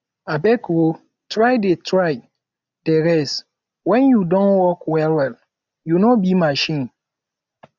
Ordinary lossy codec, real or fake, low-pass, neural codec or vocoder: none; real; 7.2 kHz; none